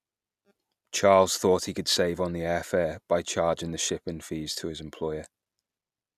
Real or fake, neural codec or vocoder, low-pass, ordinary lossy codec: real; none; 14.4 kHz; none